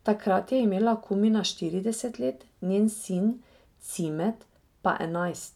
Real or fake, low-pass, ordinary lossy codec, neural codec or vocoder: real; 19.8 kHz; none; none